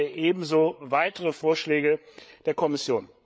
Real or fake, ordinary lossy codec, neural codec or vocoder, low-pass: fake; none; codec, 16 kHz, 8 kbps, FreqCodec, larger model; none